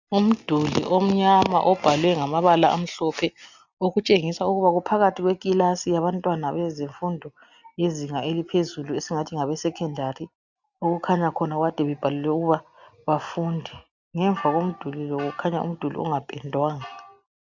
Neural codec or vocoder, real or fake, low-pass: none; real; 7.2 kHz